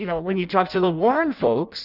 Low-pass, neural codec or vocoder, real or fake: 5.4 kHz; codec, 16 kHz in and 24 kHz out, 0.6 kbps, FireRedTTS-2 codec; fake